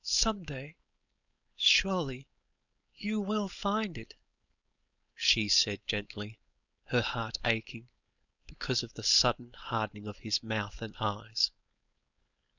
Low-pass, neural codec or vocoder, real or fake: 7.2 kHz; codec, 16 kHz, 4.8 kbps, FACodec; fake